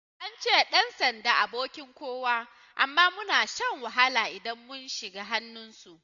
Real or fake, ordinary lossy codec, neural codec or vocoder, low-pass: real; none; none; 7.2 kHz